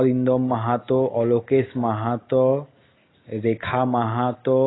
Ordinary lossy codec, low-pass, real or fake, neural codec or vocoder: AAC, 16 kbps; 7.2 kHz; real; none